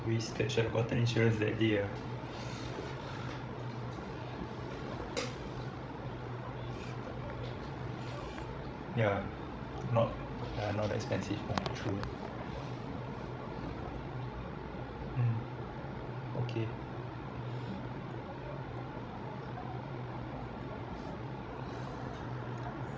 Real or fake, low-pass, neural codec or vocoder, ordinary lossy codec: fake; none; codec, 16 kHz, 16 kbps, FreqCodec, larger model; none